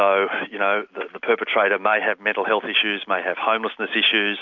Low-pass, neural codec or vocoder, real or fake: 7.2 kHz; none; real